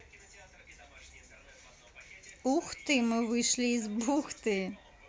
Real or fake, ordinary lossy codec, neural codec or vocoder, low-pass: real; none; none; none